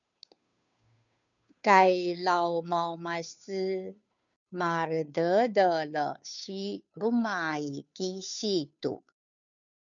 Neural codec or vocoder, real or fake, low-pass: codec, 16 kHz, 2 kbps, FunCodec, trained on Chinese and English, 25 frames a second; fake; 7.2 kHz